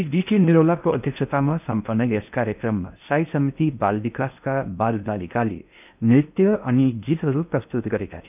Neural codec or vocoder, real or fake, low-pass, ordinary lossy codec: codec, 16 kHz in and 24 kHz out, 0.6 kbps, FocalCodec, streaming, 4096 codes; fake; 3.6 kHz; none